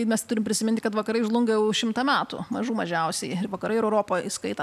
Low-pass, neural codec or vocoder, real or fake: 14.4 kHz; none; real